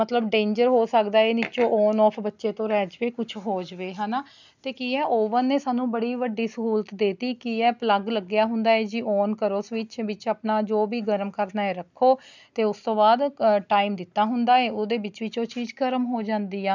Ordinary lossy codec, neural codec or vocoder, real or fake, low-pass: none; none; real; 7.2 kHz